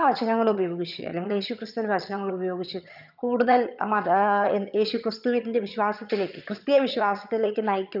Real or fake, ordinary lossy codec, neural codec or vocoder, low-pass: fake; none; vocoder, 22.05 kHz, 80 mel bands, HiFi-GAN; 5.4 kHz